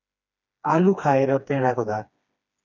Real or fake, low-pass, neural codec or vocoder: fake; 7.2 kHz; codec, 16 kHz, 2 kbps, FreqCodec, smaller model